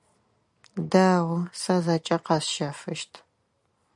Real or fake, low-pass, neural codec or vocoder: real; 10.8 kHz; none